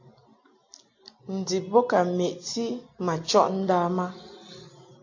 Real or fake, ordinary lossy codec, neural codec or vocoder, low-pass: real; AAC, 48 kbps; none; 7.2 kHz